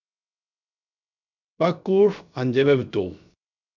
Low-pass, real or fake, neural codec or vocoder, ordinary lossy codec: 7.2 kHz; fake; codec, 16 kHz, 0.3 kbps, FocalCodec; MP3, 64 kbps